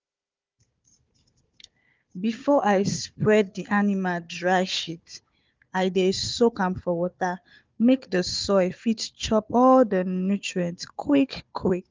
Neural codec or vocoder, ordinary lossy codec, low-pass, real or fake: codec, 16 kHz, 4 kbps, FunCodec, trained on Chinese and English, 50 frames a second; Opus, 32 kbps; 7.2 kHz; fake